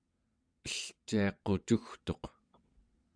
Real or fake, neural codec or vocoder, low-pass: fake; vocoder, 22.05 kHz, 80 mel bands, WaveNeXt; 9.9 kHz